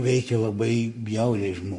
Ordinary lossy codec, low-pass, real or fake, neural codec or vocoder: MP3, 48 kbps; 10.8 kHz; fake; codec, 44.1 kHz, 7.8 kbps, Pupu-Codec